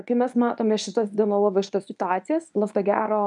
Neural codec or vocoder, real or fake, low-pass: codec, 24 kHz, 0.9 kbps, WavTokenizer, medium speech release version 1; fake; 10.8 kHz